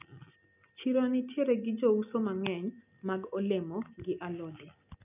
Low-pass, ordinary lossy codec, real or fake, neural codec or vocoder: 3.6 kHz; none; real; none